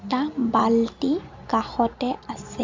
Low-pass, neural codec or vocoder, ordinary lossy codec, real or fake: 7.2 kHz; none; MP3, 64 kbps; real